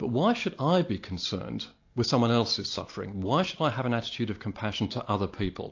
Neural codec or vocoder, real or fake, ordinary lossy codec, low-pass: none; real; AAC, 48 kbps; 7.2 kHz